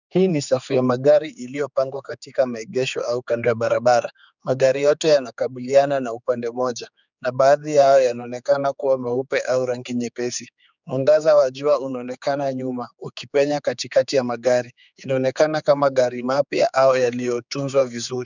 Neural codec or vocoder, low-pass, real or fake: codec, 16 kHz, 4 kbps, X-Codec, HuBERT features, trained on general audio; 7.2 kHz; fake